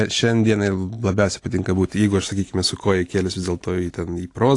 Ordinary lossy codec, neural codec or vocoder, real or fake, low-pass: AAC, 48 kbps; none; real; 10.8 kHz